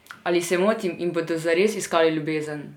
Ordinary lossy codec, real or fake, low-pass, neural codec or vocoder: none; real; 19.8 kHz; none